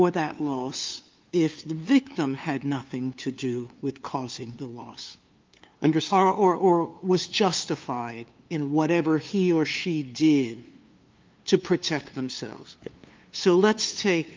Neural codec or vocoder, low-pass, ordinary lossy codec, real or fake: codec, 16 kHz, 2 kbps, FunCodec, trained on LibriTTS, 25 frames a second; 7.2 kHz; Opus, 24 kbps; fake